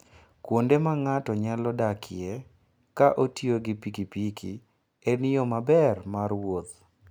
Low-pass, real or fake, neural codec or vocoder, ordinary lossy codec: none; real; none; none